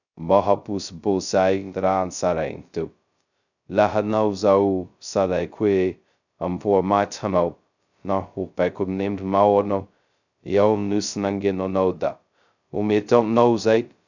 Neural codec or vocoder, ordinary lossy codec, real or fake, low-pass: codec, 16 kHz, 0.2 kbps, FocalCodec; none; fake; 7.2 kHz